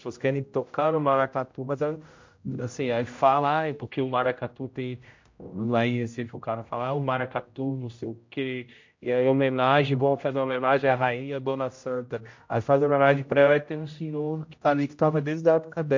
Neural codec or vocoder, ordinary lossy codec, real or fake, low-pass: codec, 16 kHz, 0.5 kbps, X-Codec, HuBERT features, trained on general audio; MP3, 48 kbps; fake; 7.2 kHz